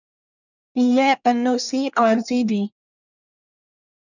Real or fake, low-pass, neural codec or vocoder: fake; 7.2 kHz; codec, 24 kHz, 1 kbps, SNAC